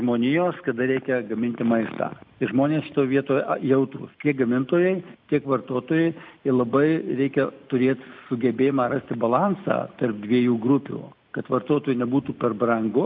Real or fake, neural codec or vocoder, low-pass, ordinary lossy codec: real; none; 5.4 kHz; AAC, 48 kbps